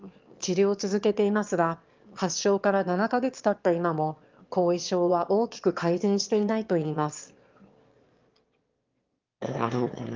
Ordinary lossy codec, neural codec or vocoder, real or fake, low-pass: Opus, 32 kbps; autoencoder, 22.05 kHz, a latent of 192 numbers a frame, VITS, trained on one speaker; fake; 7.2 kHz